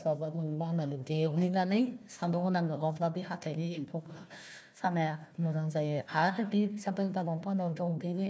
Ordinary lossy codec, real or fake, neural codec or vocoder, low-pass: none; fake; codec, 16 kHz, 1 kbps, FunCodec, trained on Chinese and English, 50 frames a second; none